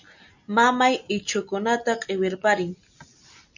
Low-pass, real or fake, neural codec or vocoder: 7.2 kHz; real; none